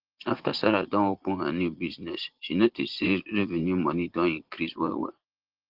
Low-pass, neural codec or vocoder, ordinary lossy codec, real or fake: 5.4 kHz; vocoder, 44.1 kHz, 80 mel bands, Vocos; Opus, 16 kbps; fake